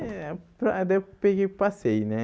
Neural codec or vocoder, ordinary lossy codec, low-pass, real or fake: none; none; none; real